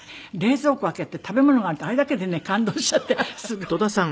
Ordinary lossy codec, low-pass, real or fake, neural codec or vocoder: none; none; real; none